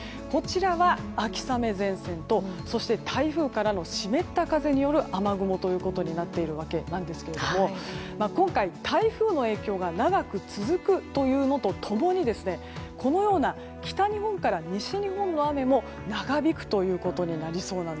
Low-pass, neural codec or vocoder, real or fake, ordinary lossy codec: none; none; real; none